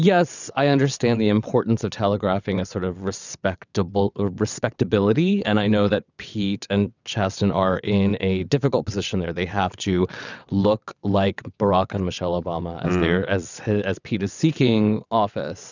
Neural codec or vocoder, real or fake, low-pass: vocoder, 22.05 kHz, 80 mel bands, WaveNeXt; fake; 7.2 kHz